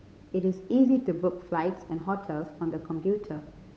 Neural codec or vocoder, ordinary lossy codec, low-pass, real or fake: codec, 16 kHz, 8 kbps, FunCodec, trained on Chinese and English, 25 frames a second; none; none; fake